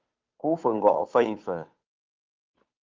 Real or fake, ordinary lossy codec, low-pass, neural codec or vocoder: fake; Opus, 24 kbps; 7.2 kHz; codec, 16 kHz, 2 kbps, FunCodec, trained on Chinese and English, 25 frames a second